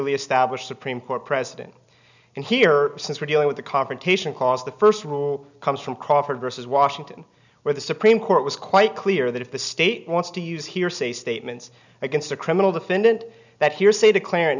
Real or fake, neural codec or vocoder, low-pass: real; none; 7.2 kHz